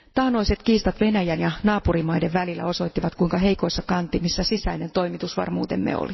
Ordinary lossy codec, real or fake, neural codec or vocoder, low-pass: MP3, 24 kbps; real; none; 7.2 kHz